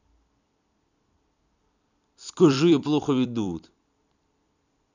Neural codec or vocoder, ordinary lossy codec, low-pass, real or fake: vocoder, 44.1 kHz, 128 mel bands every 256 samples, BigVGAN v2; none; 7.2 kHz; fake